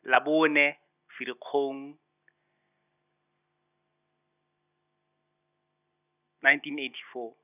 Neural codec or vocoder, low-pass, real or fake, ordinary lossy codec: none; 3.6 kHz; real; none